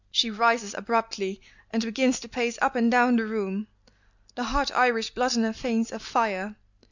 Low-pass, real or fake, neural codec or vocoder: 7.2 kHz; real; none